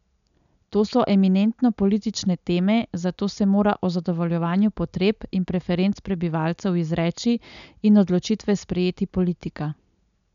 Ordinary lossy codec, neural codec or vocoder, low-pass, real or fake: none; none; 7.2 kHz; real